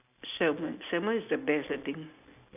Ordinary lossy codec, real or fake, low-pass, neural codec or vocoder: none; real; 3.6 kHz; none